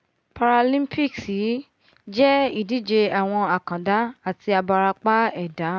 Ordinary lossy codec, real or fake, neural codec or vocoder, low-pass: none; real; none; none